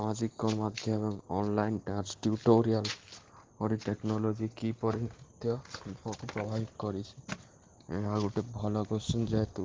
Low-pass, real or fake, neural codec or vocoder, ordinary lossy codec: 7.2 kHz; real; none; Opus, 16 kbps